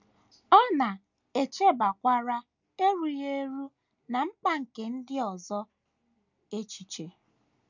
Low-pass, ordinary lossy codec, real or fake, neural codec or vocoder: 7.2 kHz; none; real; none